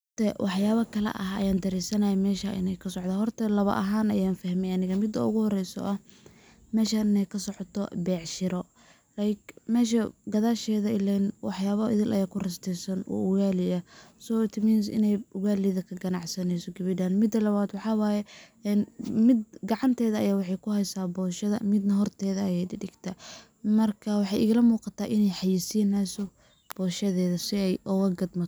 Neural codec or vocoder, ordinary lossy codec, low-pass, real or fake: none; none; none; real